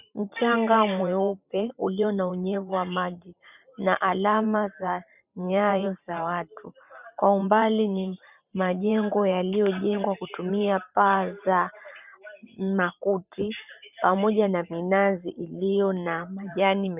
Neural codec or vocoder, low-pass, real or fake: vocoder, 44.1 kHz, 128 mel bands every 512 samples, BigVGAN v2; 3.6 kHz; fake